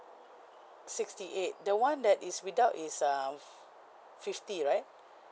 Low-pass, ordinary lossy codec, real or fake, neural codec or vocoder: none; none; real; none